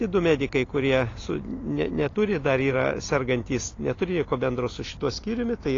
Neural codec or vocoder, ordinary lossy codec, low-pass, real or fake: none; AAC, 32 kbps; 7.2 kHz; real